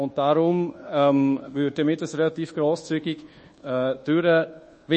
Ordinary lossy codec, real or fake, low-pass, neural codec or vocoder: MP3, 32 kbps; fake; 10.8 kHz; codec, 24 kHz, 1.2 kbps, DualCodec